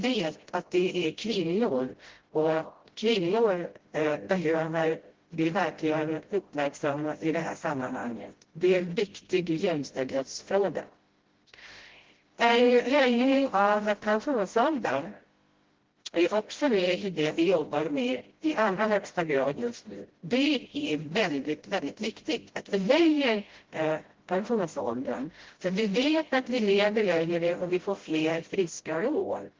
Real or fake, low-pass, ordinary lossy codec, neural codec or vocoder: fake; 7.2 kHz; Opus, 16 kbps; codec, 16 kHz, 0.5 kbps, FreqCodec, smaller model